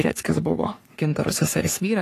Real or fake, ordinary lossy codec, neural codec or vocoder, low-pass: fake; AAC, 48 kbps; codec, 44.1 kHz, 3.4 kbps, Pupu-Codec; 14.4 kHz